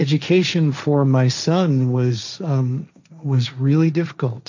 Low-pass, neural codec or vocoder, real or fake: 7.2 kHz; codec, 16 kHz, 1.1 kbps, Voila-Tokenizer; fake